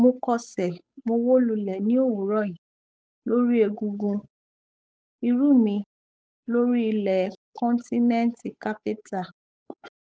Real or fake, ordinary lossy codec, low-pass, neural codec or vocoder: fake; none; none; codec, 16 kHz, 8 kbps, FunCodec, trained on Chinese and English, 25 frames a second